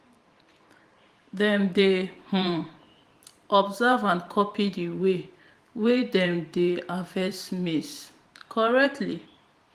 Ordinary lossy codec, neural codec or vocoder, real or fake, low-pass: Opus, 24 kbps; vocoder, 44.1 kHz, 128 mel bands every 512 samples, BigVGAN v2; fake; 14.4 kHz